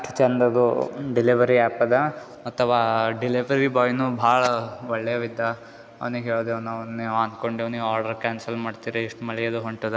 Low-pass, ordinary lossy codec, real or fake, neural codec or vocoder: none; none; real; none